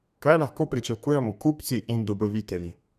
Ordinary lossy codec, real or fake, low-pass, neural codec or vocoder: none; fake; 14.4 kHz; codec, 32 kHz, 1.9 kbps, SNAC